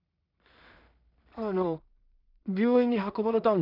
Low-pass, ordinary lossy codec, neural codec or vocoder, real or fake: 5.4 kHz; none; codec, 16 kHz in and 24 kHz out, 0.4 kbps, LongCat-Audio-Codec, two codebook decoder; fake